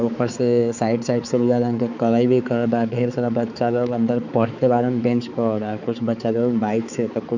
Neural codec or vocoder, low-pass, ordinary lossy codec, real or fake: codec, 16 kHz, 4 kbps, X-Codec, HuBERT features, trained on balanced general audio; 7.2 kHz; none; fake